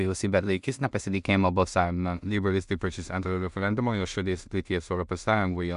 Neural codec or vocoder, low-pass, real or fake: codec, 16 kHz in and 24 kHz out, 0.4 kbps, LongCat-Audio-Codec, two codebook decoder; 10.8 kHz; fake